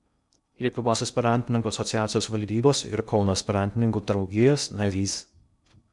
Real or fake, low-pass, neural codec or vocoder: fake; 10.8 kHz; codec, 16 kHz in and 24 kHz out, 0.6 kbps, FocalCodec, streaming, 2048 codes